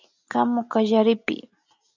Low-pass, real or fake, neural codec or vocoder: 7.2 kHz; real; none